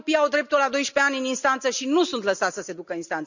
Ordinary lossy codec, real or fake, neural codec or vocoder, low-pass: none; real; none; 7.2 kHz